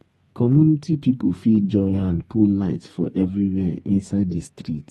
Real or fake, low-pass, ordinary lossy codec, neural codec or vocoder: fake; 14.4 kHz; AAC, 32 kbps; codec, 32 kHz, 1.9 kbps, SNAC